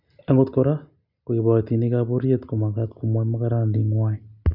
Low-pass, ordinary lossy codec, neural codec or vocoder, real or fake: 5.4 kHz; none; none; real